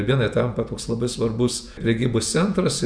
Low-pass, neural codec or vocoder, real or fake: 9.9 kHz; vocoder, 48 kHz, 128 mel bands, Vocos; fake